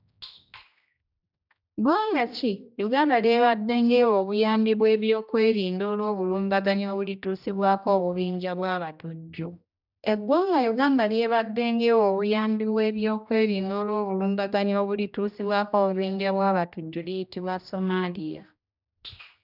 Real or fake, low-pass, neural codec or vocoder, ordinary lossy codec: fake; 5.4 kHz; codec, 16 kHz, 1 kbps, X-Codec, HuBERT features, trained on general audio; none